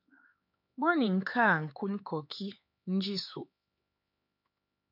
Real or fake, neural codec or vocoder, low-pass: fake; codec, 16 kHz, 4 kbps, X-Codec, HuBERT features, trained on LibriSpeech; 5.4 kHz